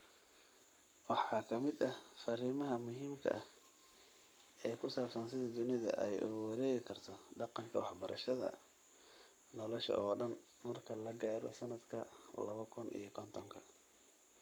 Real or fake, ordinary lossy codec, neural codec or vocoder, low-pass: fake; none; codec, 44.1 kHz, 7.8 kbps, Pupu-Codec; none